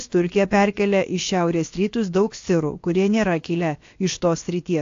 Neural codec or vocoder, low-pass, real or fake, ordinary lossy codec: codec, 16 kHz, about 1 kbps, DyCAST, with the encoder's durations; 7.2 kHz; fake; AAC, 48 kbps